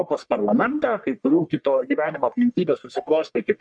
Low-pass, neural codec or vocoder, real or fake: 9.9 kHz; codec, 44.1 kHz, 1.7 kbps, Pupu-Codec; fake